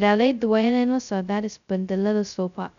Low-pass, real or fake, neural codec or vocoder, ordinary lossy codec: 7.2 kHz; fake; codec, 16 kHz, 0.2 kbps, FocalCodec; none